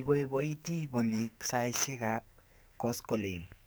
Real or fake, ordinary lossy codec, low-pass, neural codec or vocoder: fake; none; none; codec, 44.1 kHz, 2.6 kbps, SNAC